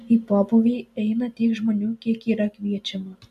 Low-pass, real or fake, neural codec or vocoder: 14.4 kHz; real; none